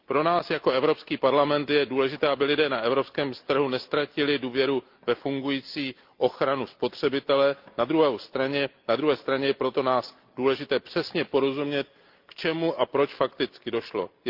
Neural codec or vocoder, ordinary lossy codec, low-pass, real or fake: none; Opus, 24 kbps; 5.4 kHz; real